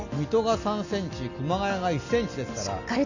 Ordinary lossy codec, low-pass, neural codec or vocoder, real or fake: none; 7.2 kHz; none; real